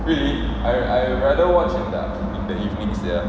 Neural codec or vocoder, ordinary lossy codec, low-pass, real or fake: none; none; none; real